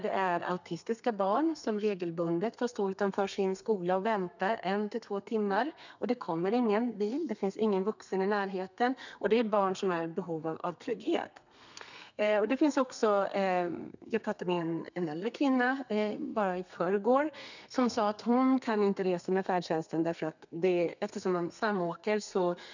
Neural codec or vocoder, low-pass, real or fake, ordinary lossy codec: codec, 32 kHz, 1.9 kbps, SNAC; 7.2 kHz; fake; none